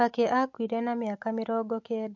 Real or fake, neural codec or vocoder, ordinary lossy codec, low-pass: real; none; MP3, 48 kbps; 7.2 kHz